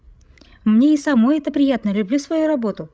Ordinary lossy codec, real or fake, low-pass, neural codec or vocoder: none; fake; none; codec, 16 kHz, 16 kbps, FreqCodec, larger model